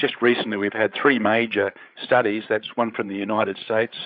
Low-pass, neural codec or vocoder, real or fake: 5.4 kHz; codec, 16 kHz, 8 kbps, FreqCodec, larger model; fake